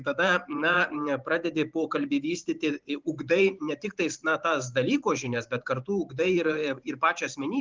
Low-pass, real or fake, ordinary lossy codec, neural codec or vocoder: 7.2 kHz; fake; Opus, 24 kbps; vocoder, 44.1 kHz, 128 mel bands every 512 samples, BigVGAN v2